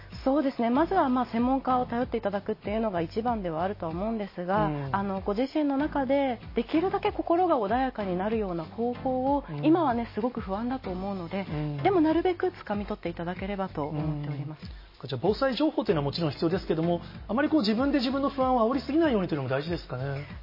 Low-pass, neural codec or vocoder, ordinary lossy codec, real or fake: 5.4 kHz; none; MP3, 24 kbps; real